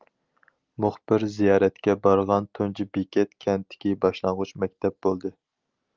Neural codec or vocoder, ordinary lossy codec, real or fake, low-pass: none; Opus, 32 kbps; real; 7.2 kHz